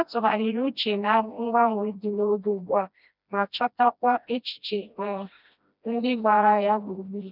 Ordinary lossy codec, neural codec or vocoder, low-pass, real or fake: none; codec, 16 kHz, 1 kbps, FreqCodec, smaller model; 5.4 kHz; fake